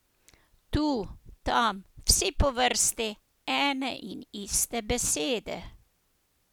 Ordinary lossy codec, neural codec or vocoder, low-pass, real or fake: none; none; none; real